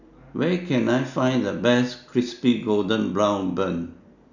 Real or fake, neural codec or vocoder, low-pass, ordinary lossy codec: real; none; 7.2 kHz; none